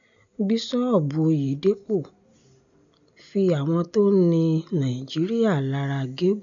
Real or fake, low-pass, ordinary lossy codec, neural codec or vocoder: real; 7.2 kHz; none; none